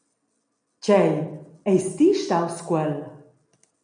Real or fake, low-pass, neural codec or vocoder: real; 9.9 kHz; none